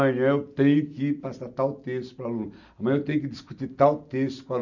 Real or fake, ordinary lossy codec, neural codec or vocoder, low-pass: real; none; none; 7.2 kHz